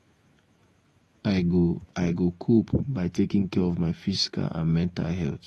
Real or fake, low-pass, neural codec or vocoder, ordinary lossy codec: fake; 19.8 kHz; autoencoder, 48 kHz, 128 numbers a frame, DAC-VAE, trained on Japanese speech; AAC, 32 kbps